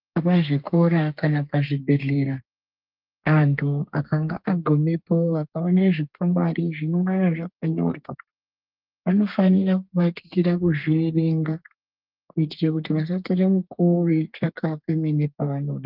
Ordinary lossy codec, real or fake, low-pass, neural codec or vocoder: Opus, 24 kbps; fake; 5.4 kHz; codec, 32 kHz, 1.9 kbps, SNAC